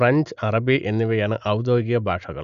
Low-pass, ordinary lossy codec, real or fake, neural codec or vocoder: 7.2 kHz; Opus, 64 kbps; real; none